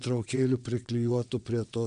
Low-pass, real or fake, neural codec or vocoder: 9.9 kHz; fake; vocoder, 22.05 kHz, 80 mel bands, WaveNeXt